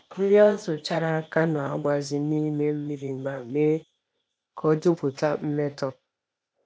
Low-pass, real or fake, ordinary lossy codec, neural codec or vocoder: none; fake; none; codec, 16 kHz, 0.8 kbps, ZipCodec